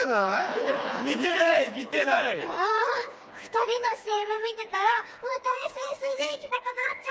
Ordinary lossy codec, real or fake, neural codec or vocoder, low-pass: none; fake; codec, 16 kHz, 2 kbps, FreqCodec, smaller model; none